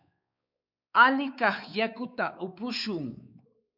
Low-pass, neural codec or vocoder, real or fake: 5.4 kHz; codec, 16 kHz, 4 kbps, X-Codec, WavLM features, trained on Multilingual LibriSpeech; fake